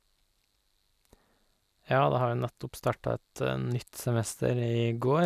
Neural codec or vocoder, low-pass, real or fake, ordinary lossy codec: none; 14.4 kHz; real; none